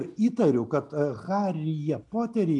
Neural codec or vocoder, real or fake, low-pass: none; real; 10.8 kHz